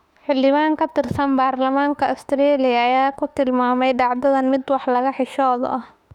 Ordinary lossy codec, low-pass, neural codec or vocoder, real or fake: none; 19.8 kHz; autoencoder, 48 kHz, 32 numbers a frame, DAC-VAE, trained on Japanese speech; fake